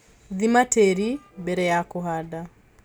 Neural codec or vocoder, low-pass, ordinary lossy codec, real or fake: none; none; none; real